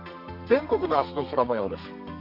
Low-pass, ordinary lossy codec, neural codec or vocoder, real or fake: 5.4 kHz; none; codec, 32 kHz, 1.9 kbps, SNAC; fake